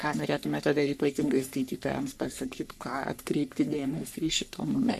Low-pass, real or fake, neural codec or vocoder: 14.4 kHz; fake; codec, 44.1 kHz, 3.4 kbps, Pupu-Codec